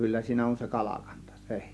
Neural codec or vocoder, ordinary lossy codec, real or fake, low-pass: none; none; real; none